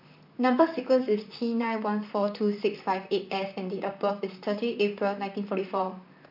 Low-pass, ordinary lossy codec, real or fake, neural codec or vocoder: 5.4 kHz; MP3, 48 kbps; fake; vocoder, 44.1 kHz, 128 mel bands, Pupu-Vocoder